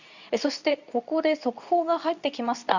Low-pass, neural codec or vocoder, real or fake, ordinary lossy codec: 7.2 kHz; codec, 24 kHz, 0.9 kbps, WavTokenizer, medium speech release version 2; fake; none